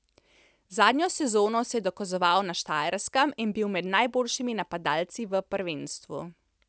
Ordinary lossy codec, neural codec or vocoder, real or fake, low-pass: none; none; real; none